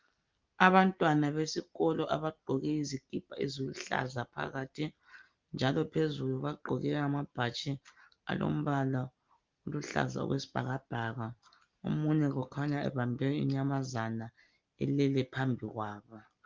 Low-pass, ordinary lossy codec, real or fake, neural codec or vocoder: 7.2 kHz; Opus, 32 kbps; real; none